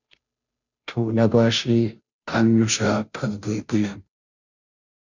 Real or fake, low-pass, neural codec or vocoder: fake; 7.2 kHz; codec, 16 kHz, 0.5 kbps, FunCodec, trained on Chinese and English, 25 frames a second